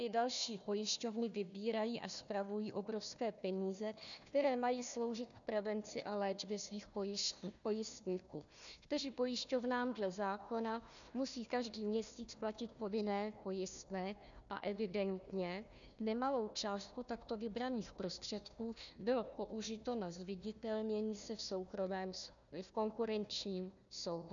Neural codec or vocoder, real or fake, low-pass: codec, 16 kHz, 1 kbps, FunCodec, trained on Chinese and English, 50 frames a second; fake; 7.2 kHz